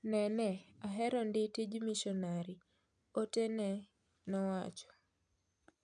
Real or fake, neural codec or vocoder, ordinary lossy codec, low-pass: real; none; none; 9.9 kHz